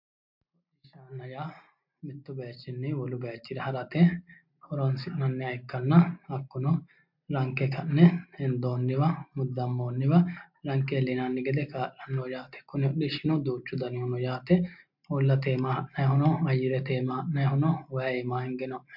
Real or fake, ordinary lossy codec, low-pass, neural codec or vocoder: real; MP3, 48 kbps; 5.4 kHz; none